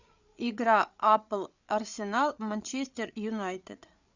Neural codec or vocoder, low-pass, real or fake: codec, 16 kHz, 8 kbps, FreqCodec, larger model; 7.2 kHz; fake